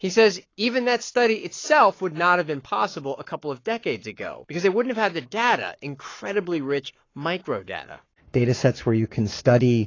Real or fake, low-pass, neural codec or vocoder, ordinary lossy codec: fake; 7.2 kHz; codec, 44.1 kHz, 7.8 kbps, Pupu-Codec; AAC, 32 kbps